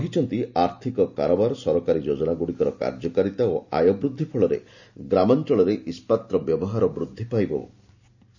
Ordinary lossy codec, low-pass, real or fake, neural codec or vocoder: none; 7.2 kHz; real; none